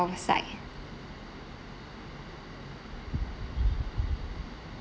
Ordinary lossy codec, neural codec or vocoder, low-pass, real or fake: none; none; none; real